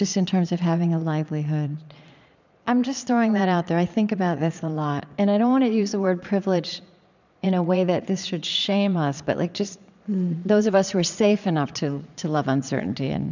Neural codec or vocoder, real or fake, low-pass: vocoder, 22.05 kHz, 80 mel bands, Vocos; fake; 7.2 kHz